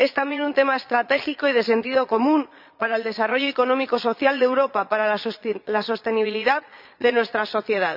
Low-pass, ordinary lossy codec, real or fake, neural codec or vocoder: 5.4 kHz; none; fake; vocoder, 22.05 kHz, 80 mel bands, Vocos